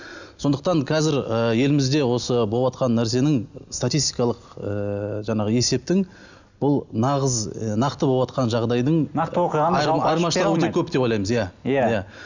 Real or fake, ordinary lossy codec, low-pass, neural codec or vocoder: real; none; 7.2 kHz; none